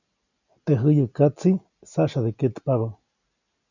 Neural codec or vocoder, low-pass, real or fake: none; 7.2 kHz; real